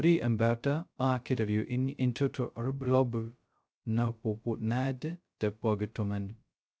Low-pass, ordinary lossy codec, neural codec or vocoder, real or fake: none; none; codec, 16 kHz, 0.2 kbps, FocalCodec; fake